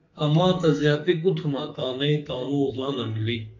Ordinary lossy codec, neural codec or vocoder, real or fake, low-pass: MP3, 48 kbps; codec, 32 kHz, 1.9 kbps, SNAC; fake; 7.2 kHz